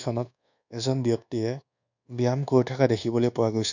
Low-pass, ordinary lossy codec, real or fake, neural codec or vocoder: 7.2 kHz; none; fake; codec, 24 kHz, 1.2 kbps, DualCodec